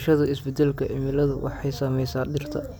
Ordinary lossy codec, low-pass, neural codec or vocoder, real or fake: none; none; none; real